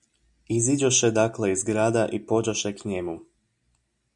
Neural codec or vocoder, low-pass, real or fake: none; 10.8 kHz; real